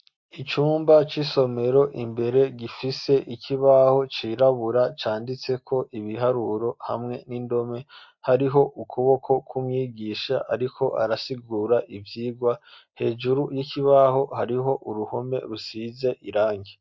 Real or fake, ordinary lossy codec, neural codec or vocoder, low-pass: real; MP3, 48 kbps; none; 7.2 kHz